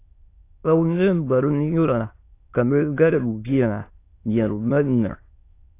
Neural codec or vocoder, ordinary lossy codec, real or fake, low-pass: autoencoder, 22.05 kHz, a latent of 192 numbers a frame, VITS, trained on many speakers; AAC, 24 kbps; fake; 3.6 kHz